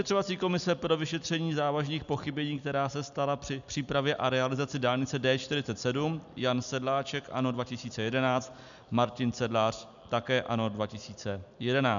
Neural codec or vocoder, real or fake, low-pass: none; real; 7.2 kHz